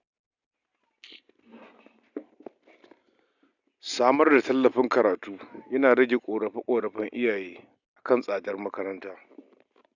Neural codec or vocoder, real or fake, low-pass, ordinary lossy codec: none; real; 7.2 kHz; none